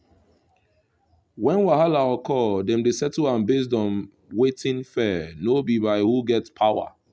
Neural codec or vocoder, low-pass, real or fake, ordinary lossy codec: none; none; real; none